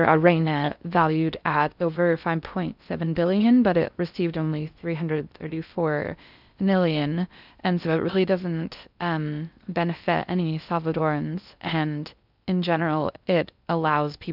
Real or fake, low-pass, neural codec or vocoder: fake; 5.4 kHz; codec, 16 kHz in and 24 kHz out, 0.6 kbps, FocalCodec, streaming, 4096 codes